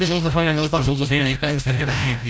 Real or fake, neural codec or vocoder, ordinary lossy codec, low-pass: fake; codec, 16 kHz, 0.5 kbps, FreqCodec, larger model; none; none